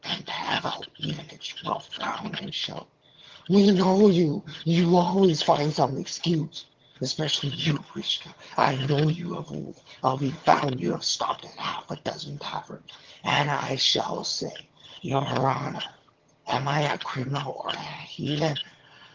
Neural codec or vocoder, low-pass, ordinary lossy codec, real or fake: vocoder, 22.05 kHz, 80 mel bands, HiFi-GAN; 7.2 kHz; Opus, 16 kbps; fake